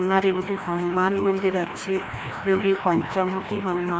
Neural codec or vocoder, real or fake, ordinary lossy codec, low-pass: codec, 16 kHz, 1 kbps, FreqCodec, larger model; fake; none; none